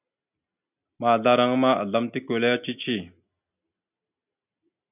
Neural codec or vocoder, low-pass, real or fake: none; 3.6 kHz; real